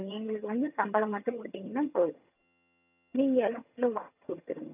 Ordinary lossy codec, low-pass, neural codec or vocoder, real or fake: none; 3.6 kHz; vocoder, 22.05 kHz, 80 mel bands, HiFi-GAN; fake